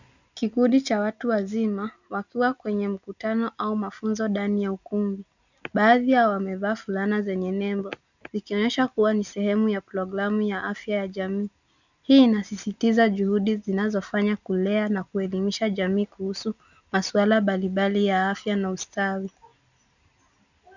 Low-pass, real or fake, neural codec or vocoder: 7.2 kHz; real; none